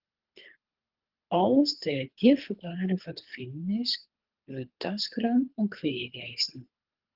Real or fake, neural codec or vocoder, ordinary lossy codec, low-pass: fake; codec, 24 kHz, 3 kbps, HILCodec; Opus, 32 kbps; 5.4 kHz